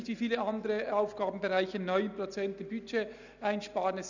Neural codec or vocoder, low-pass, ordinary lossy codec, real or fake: none; 7.2 kHz; none; real